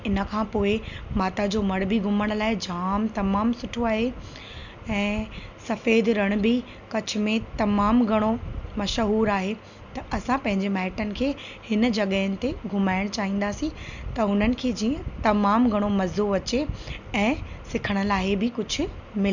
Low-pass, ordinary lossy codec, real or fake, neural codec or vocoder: 7.2 kHz; none; real; none